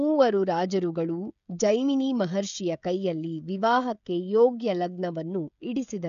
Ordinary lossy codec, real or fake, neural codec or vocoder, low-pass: MP3, 96 kbps; fake; codec, 16 kHz, 16 kbps, FreqCodec, smaller model; 7.2 kHz